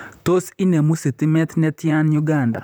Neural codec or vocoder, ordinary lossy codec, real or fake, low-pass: vocoder, 44.1 kHz, 128 mel bands, Pupu-Vocoder; none; fake; none